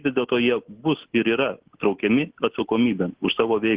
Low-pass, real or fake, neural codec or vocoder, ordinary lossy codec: 3.6 kHz; real; none; Opus, 16 kbps